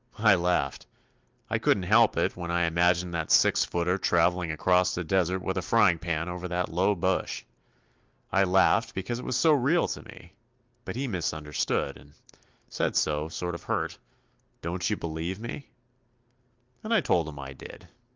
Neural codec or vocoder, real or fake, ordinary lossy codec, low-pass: none; real; Opus, 32 kbps; 7.2 kHz